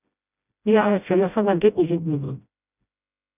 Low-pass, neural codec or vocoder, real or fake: 3.6 kHz; codec, 16 kHz, 0.5 kbps, FreqCodec, smaller model; fake